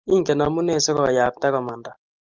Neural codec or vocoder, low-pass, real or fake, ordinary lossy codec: none; 7.2 kHz; real; Opus, 24 kbps